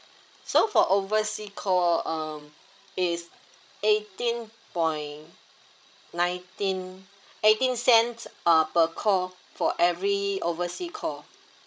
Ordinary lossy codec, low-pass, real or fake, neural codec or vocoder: none; none; fake; codec, 16 kHz, 16 kbps, FreqCodec, larger model